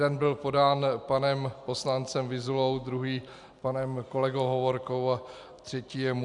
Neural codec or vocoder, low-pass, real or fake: none; 10.8 kHz; real